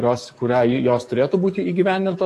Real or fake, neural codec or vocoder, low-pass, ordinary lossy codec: fake; codec, 44.1 kHz, 7.8 kbps, DAC; 14.4 kHz; AAC, 48 kbps